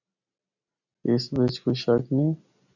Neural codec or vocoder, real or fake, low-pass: none; real; 7.2 kHz